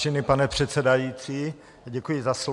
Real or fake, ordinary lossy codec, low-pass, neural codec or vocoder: real; MP3, 64 kbps; 10.8 kHz; none